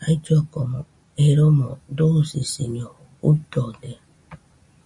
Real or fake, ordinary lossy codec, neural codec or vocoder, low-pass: real; MP3, 48 kbps; none; 10.8 kHz